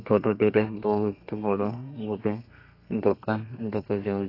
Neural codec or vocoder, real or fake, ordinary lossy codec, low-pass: codec, 32 kHz, 1.9 kbps, SNAC; fake; AAC, 32 kbps; 5.4 kHz